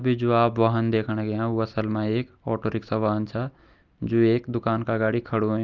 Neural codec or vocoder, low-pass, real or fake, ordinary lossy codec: none; 7.2 kHz; real; Opus, 24 kbps